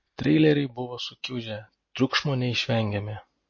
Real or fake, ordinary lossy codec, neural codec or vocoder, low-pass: real; MP3, 32 kbps; none; 7.2 kHz